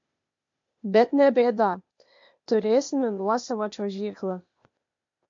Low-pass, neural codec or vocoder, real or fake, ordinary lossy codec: 7.2 kHz; codec, 16 kHz, 0.8 kbps, ZipCodec; fake; MP3, 48 kbps